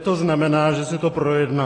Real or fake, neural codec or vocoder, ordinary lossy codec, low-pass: real; none; AAC, 32 kbps; 10.8 kHz